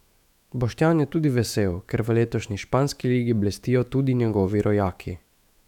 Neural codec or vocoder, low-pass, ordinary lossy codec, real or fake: autoencoder, 48 kHz, 128 numbers a frame, DAC-VAE, trained on Japanese speech; 19.8 kHz; none; fake